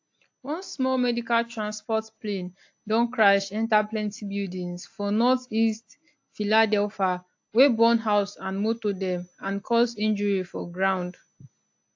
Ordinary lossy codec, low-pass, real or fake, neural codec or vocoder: AAC, 48 kbps; 7.2 kHz; real; none